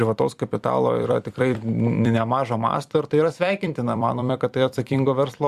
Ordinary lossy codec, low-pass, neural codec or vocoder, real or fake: Opus, 64 kbps; 14.4 kHz; vocoder, 44.1 kHz, 128 mel bands every 256 samples, BigVGAN v2; fake